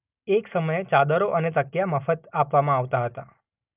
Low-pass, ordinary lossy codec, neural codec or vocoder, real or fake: 3.6 kHz; none; none; real